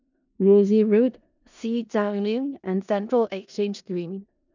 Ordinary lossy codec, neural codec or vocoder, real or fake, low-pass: none; codec, 16 kHz in and 24 kHz out, 0.4 kbps, LongCat-Audio-Codec, four codebook decoder; fake; 7.2 kHz